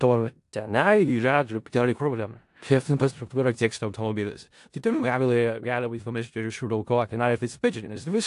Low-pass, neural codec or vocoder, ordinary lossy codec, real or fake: 10.8 kHz; codec, 16 kHz in and 24 kHz out, 0.4 kbps, LongCat-Audio-Codec, four codebook decoder; AAC, 64 kbps; fake